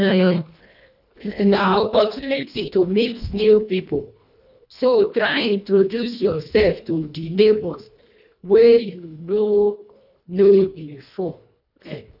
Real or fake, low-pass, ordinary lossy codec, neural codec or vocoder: fake; 5.4 kHz; none; codec, 24 kHz, 1.5 kbps, HILCodec